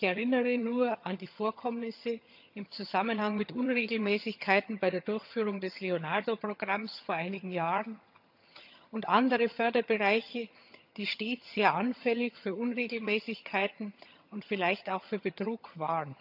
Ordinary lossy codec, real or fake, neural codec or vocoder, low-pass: none; fake; vocoder, 22.05 kHz, 80 mel bands, HiFi-GAN; 5.4 kHz